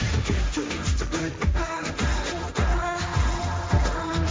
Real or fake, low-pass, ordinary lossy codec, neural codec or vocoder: fake; none; none; codec, 16 kHz, 1.1 kbps, Voila-Tokenizer